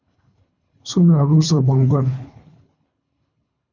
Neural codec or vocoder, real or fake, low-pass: codec, 24 kHz, 3 kbps, HILCodec; fake; 7.2 kHz